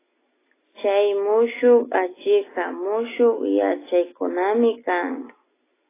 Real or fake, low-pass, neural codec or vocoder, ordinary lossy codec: real; 3.6 kHz; none; AAC, 16 kbps